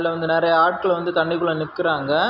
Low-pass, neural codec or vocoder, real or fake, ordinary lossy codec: 5.4 kHz; none; real; none